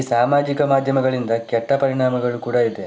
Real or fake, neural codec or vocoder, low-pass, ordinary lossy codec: real; none; none; none